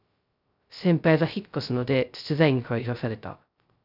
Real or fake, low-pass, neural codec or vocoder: fake; 5.4 kHz; codec, 16 kHz, 0.2 kbps, FocalCodec